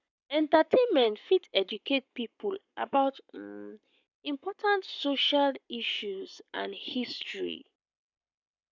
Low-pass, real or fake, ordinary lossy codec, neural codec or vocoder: 7.2 kHz; fake; none; codec, 44.1 kHz, 7.8 kbps, Pupu-Codec